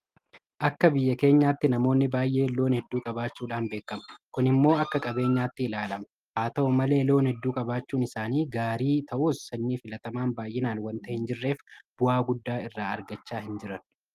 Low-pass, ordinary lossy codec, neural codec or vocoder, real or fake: 14.4 kHz; Opus, 32 kbps; none; real